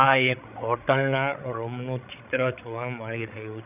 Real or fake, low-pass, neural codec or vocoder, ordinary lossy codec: fake; 3.6 kHz; codec, 16 kHz, 16 kbps, FreqCodec, larger model; none